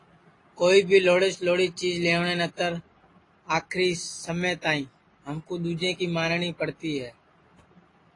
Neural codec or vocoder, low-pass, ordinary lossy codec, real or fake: none; 10.8 kHz; AAC, 32 kbps; real